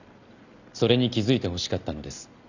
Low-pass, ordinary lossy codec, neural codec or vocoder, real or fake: 7.2 kHz; none; none; real